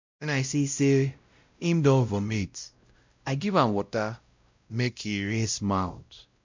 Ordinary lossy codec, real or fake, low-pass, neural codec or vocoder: MP3, 64 kbps; fake; 7.2 kHz; codec, 16 kHz, 0.5 kbps, X-Codec, WavLM features, trained on Multilingual LibriSpeech